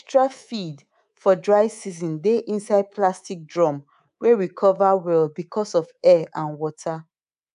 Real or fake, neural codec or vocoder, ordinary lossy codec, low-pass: fake; codec, 24 kHz, 3.1 kbps, DualCodec; none; 10.8 kHz